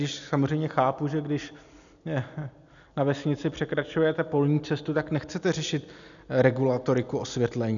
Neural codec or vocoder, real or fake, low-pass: none; real; 7.2 kHz